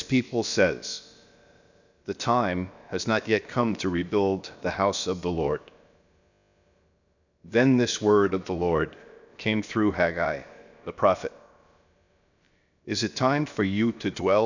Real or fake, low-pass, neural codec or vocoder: fake; 7.2 kHz; codec, 16 kHz, about 1 kbps, DyCAST, with the encoder's durations